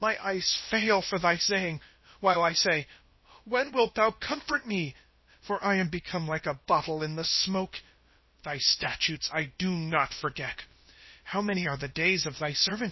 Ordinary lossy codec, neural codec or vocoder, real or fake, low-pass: MP3, 24 kbps; codec, 16 kHz, about 1 kbps, DyCAST, with the encoder's durations; fake; 7.2 kHz